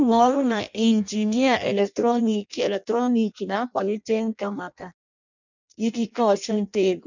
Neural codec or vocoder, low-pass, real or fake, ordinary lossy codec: codec, 16 kHz in and 24 kHz out, 0.6 kbps, FireRedTTS-2 codec; 7.2 kHz; fake; none